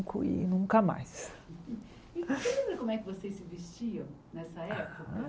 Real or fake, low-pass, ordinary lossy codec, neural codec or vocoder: real; none; none; none